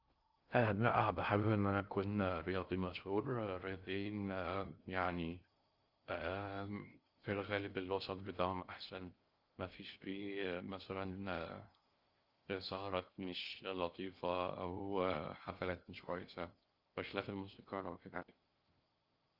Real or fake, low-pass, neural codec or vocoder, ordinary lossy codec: fake; 5.4 kHz; codec, 16 kHz in and 24 kHz out, 0.6 kbps, FocalCodec, streaming, 2048 codes; Opus, 24 kbps